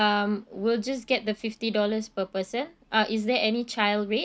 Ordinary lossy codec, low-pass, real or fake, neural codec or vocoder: none; none; real; none